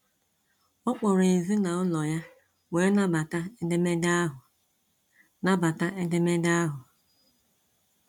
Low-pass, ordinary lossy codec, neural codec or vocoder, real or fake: 19.8 kHz; MP3, 96 kbps; none; real